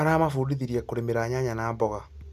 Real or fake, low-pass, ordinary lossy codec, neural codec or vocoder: real; 14.4 kHz; none; none